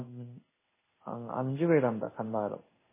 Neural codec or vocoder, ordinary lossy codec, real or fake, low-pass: codec, 16 kHz in and 24 kHz out, 1 kbps, XY-Tokenizer; MP3, 16 kbps; fake; 3.6 kHz